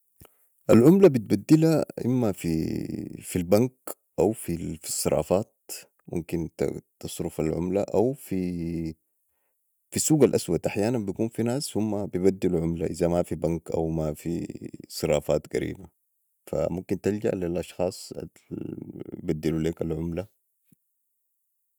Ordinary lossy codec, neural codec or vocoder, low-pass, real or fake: none; none; none; real